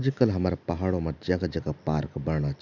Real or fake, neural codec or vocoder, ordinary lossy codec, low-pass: real; none; none; 7.2 kHz